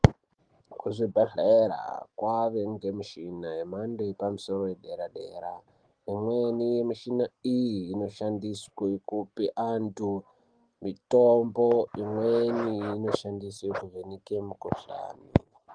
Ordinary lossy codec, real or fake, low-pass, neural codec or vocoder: Opus, 24 kbps; real; 9.9 kHz; none